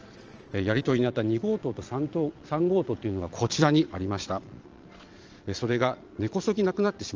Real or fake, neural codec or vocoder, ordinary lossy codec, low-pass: fake; vocoder, 22.05 kHz, 80 mel bands, Vocos; Opus, 24 kbps; 7.2 kHz